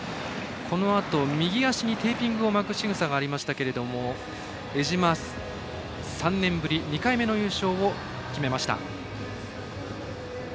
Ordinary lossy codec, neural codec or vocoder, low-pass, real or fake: none; none; none; real